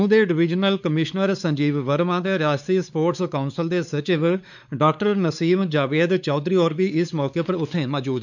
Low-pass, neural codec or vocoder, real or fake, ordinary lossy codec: 7.2 kHz; codec, 16 kHz, 4 kbps, X-Codec, WavLM features, trained on Multilingual LibriSpeech; fake; none